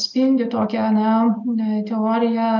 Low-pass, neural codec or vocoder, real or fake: 7.2 kHz; none; real